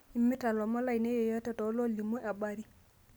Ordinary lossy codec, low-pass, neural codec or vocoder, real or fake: none; none; none; real